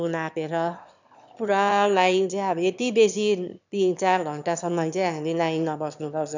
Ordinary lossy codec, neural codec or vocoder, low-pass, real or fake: none; autoencoder, 22.05 kHz, a latent of 192 numbers a frame, VITS, trained on one speaker; 7.2 kHz; fake